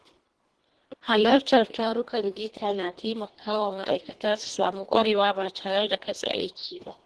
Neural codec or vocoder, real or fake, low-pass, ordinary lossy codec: codec, 24 kHz, 1.5 kbps, HILCodec; fake; 10.8 kHz; Opus, 16 kbps